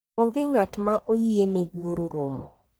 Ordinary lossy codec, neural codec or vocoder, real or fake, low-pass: none; codec, 44.1 kHz, 1.7 kbps, Pupu-Codec; fake; none